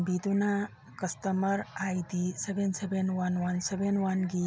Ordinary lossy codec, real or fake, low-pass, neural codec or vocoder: none; real; none; none